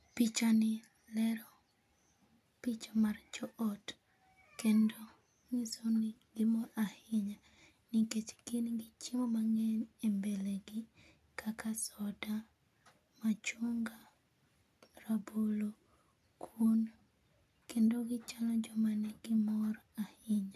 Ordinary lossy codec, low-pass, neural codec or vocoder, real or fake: none; 14.4 kHz; none; real